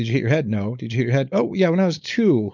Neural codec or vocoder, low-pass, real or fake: codec, 16 kHz, 4.8 kbps, FACodec; 7.2 kHz; fake